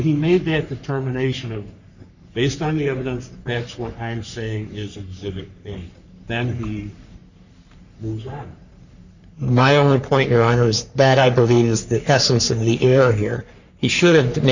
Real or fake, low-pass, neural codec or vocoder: fake; 7.2 kHz; codec, 44.1 kHz, 3.4 kbps, Pupu-Codec